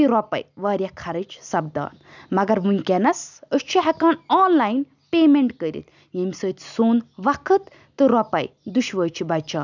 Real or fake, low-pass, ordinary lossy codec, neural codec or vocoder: real; 7.2 kHz; none; none